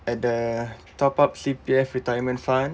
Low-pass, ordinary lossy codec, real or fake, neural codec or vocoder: none; none; real; none